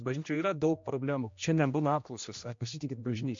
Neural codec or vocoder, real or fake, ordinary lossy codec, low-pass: codec, 16 kHz, 1 kbps, X-Codec, HuBERT features, trained on general audio; fake; MP3, 48 kbps; 7.2 kHz